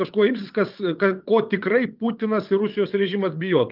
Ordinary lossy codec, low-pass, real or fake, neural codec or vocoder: Opus, 24 kbps; 5.4 kHz; fake; vocoder, 22.05 kHz, 80 mel bands, WaveNeXt